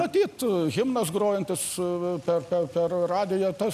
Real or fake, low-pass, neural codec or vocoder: real; 14.4 kHz; none